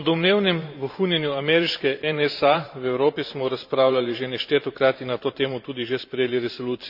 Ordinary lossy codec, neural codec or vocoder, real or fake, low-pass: none; vocoder, 44.1 kHz, 128 mel bands every 512 samples, BigVGAN v2; fake; 5.4 kHz